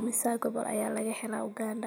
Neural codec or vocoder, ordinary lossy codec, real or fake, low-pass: none; none; real; none